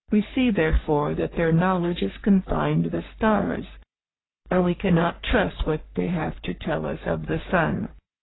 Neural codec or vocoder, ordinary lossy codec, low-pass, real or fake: codec, 44.1 kHz, 3.4 kbps, Pupu-Codec; AAC, 16 kbps; 7.2 kHz; fake